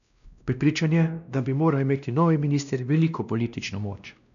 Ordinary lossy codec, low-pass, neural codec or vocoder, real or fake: none; 7.2 kHz; codec, 16 kHz, 1 kbps, X-Codec, WavLM features, trained on Multilingual LibriSpeech; fake